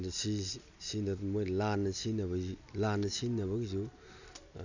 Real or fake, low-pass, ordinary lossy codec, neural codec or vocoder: real; 7.2 kHz; none; none